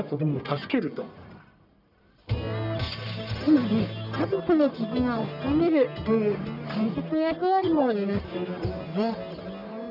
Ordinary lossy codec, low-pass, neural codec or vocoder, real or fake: none; 5.4 kHz; codec, 44.1 kHz, 1.7 kbps, Pupu-Codec; fake